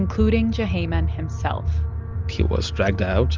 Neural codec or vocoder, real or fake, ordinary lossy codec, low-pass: none; real; Opus, 24 kbps; 7.2 kHz